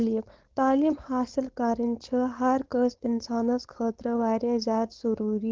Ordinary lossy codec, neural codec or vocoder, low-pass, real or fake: Opus, 16 kbps; codec, 16 kHz, 4.8 kbps, FACodec; 7.2 kHz; fake